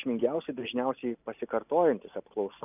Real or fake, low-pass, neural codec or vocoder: real; 3.6 kHz; none